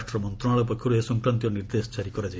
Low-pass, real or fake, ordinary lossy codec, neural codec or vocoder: none; real; none; none